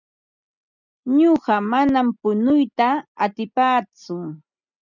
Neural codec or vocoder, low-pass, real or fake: none; 7.2 kHz; real